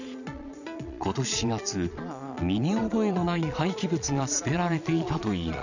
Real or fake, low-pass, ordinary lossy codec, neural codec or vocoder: fake; 7.2 kHz; none; vocoder, 22.05 kHz, 80 mel bands, Vocos